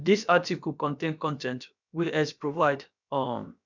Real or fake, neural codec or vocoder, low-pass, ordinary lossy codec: fake; codec, 16 kHz, about 1 kbps, DyCAST, with the encoder's durations; 7.2 kHz; none